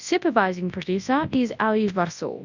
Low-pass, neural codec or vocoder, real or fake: 7.2 kHz; codec, 24 kHz, 0.9 kbps, WavTokenizer, large speech release; fake